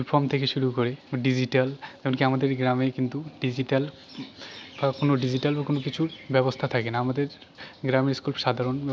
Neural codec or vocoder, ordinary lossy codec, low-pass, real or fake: none; none; none; real